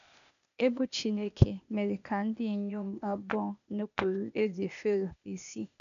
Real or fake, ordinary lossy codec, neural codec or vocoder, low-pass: fake; none; codec, 16 kHz, 0.8 kbps, ZipCodec; 7.2 kHz